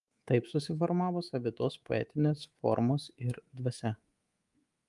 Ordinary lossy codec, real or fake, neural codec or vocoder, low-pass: Opus, 32 kbps; fake; codec, 24 kHz, 3.1 kbps, DualCodec; 10.8 kHz